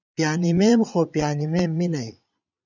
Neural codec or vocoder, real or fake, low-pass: vocoder, 22.05 kHz, 80 mel bands, Vocos; fake; 7.2 kHz